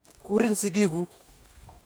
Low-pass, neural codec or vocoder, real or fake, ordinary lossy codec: none; codec, 44.1 kHz, 2.6 kbps, DAC; fake; none